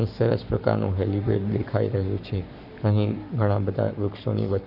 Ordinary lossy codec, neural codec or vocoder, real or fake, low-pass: none; codec, 44.1 kHz, 7.8 kbps, Pupu-Codec; fake; 5.4 kHz